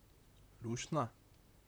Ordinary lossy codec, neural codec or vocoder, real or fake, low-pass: none; vocoder, 44.1 kHz, 128 mel bands, Pupu-Vocoder; fake; none